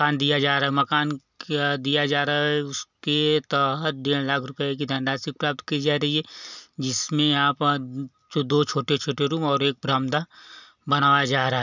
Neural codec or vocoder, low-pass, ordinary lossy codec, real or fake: none; 7.2 kHz; none; real